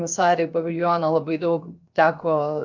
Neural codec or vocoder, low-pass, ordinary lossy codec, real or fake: codec, 16 kHz, 0.7 kbps, FocalCodec; 7.2 kHz; MP3, 64 kbps; fake